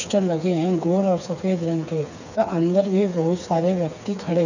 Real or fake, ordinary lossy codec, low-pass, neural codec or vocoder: fake; none; 7.2 kHz; codec, 16 kHz, 4 kbps, FreqCodec, smaller model